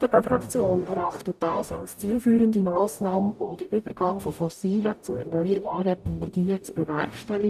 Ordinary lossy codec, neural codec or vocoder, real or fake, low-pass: none; codec, 44.1 kHz, 0.9 kbps, DAC; fake; 14.4 kHz